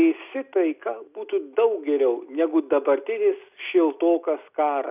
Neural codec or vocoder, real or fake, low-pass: none; real; 3.6 kHz